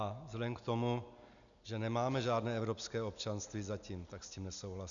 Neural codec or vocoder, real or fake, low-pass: none; real; 7.2 kHz